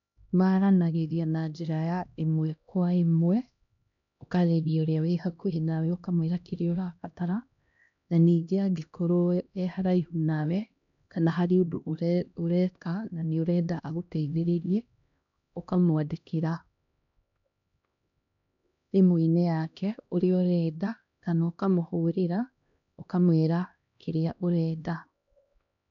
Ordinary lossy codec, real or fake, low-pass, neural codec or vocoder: MP3, 96 kbps; fake; 7.2 kHz; codec, 16 kHz, 1 kbps, X-Codec, HuBERT features, trained on LibriSpeech